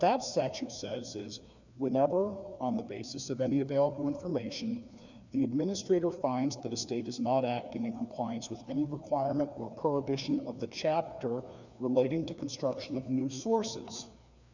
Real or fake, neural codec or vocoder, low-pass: fake; codec, 16 kHz, 2 kbps, FreqCodec, larger model; 7.2 kHz